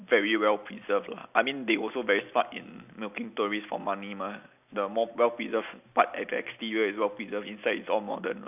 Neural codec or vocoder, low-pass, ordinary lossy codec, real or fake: none; 3.6 kHz; none; real